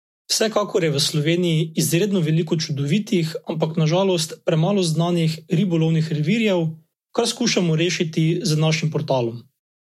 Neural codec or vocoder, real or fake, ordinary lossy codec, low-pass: none; real; MP3, 64 kbps; 19.8 kHz